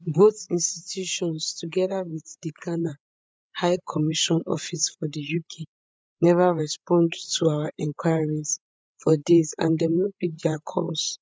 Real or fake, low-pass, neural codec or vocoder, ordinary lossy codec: fake; none; codec, 16 kHz, 8 kbps, FreqCodec, larger model; none